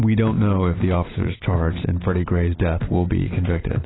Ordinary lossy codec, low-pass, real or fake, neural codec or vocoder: AAC, 16 kbps; 7.2 kHz; real; none